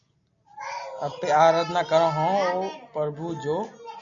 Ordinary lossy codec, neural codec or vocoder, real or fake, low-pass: AAC, 64 kbps; none; real; 7.2 kHz